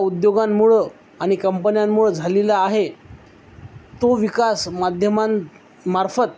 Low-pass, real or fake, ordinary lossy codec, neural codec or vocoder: none; real; none; none